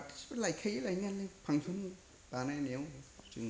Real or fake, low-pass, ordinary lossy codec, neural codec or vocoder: real; none; none; none